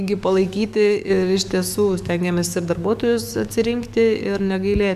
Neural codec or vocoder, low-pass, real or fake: codec, 44.1 kHz, 7.8 kbps, DAC; 14.4 kHz; fake